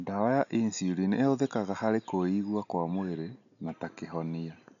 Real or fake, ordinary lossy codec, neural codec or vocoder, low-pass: real; none; none; 7.2 kHz